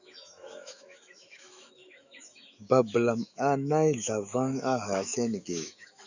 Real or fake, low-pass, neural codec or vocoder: fake; 7.2 kHz; autoencoder, 48 kHz, 128 numbers a frame, DAC-VAE, trained on Japanese speech